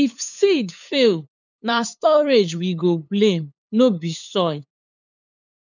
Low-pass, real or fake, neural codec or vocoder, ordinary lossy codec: 7.2 kHz; fake; codec, 16 kHz, 8 kbps, FunCodec, trained on LibriTTS, 25 frames a second; none